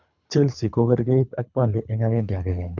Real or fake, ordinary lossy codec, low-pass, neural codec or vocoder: fake; none; 7.2 kHz; codec, 24 kHz, 3 kbps, HILCodec